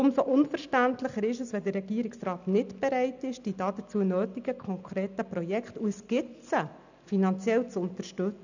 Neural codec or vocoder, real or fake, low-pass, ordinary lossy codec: none; real; 7.2 kHz; none